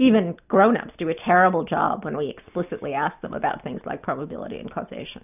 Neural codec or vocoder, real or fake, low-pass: none; real; 3.6 kHz